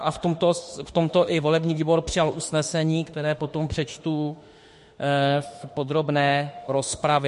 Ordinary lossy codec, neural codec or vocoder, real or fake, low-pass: MP3, 48 kbps; autoencoder, 48 kHz, 32 numbers a frame, DAC-VAE, trained on Japanese speech; fake; 14.4 kHz